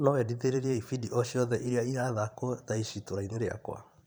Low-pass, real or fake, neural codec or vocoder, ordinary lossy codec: none; fake; vocoder, 44.1 kHz, 128 mel bands every 512 samples, BigVGAN v2; none